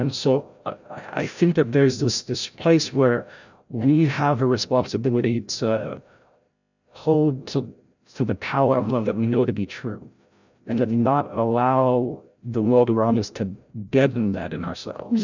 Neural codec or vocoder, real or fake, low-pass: codec, 16 kHz, 0.5 kbps, FreqCodec, larger model; fake; 7.2 kHz